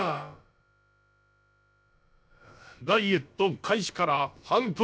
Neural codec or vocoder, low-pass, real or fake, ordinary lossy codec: codec, 16 kHz, about 1 kbps, DyCAST, with the encoder's durations; none; fake; none